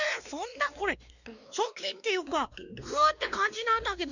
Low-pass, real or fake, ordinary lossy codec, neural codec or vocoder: 7.2 kHz; fake; none; codec, 16 kHz, 2 kbps, X-Codec, WavLM features, trained on Multilingual LibriSpeech